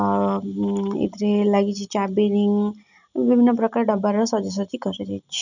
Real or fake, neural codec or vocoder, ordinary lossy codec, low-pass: real; none; none; 7.2 kHz